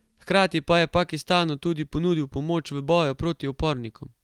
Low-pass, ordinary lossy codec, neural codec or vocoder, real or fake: 19.8 kHz; Opus, 24 kbps; none; real